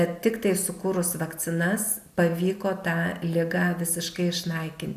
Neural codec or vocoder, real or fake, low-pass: none; real; 14.4 kHz